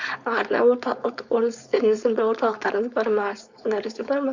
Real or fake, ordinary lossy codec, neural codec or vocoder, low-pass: fake; Opus, 64 kbps; codec, 16 kHz, 4.8 kbps, FACodec; 7.2 kHz